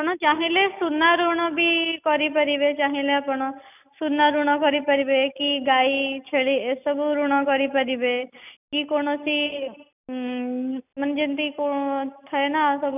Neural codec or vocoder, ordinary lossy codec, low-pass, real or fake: none; none; 3.6 kHz; real